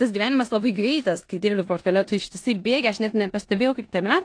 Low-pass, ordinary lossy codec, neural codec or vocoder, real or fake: 9.9 kHz; AAC, 48 kbps; codec, 16 kHz in and 24 kHz out, 0.9 kbps, LongCat-Audio-Codec, four codebook decoder; fake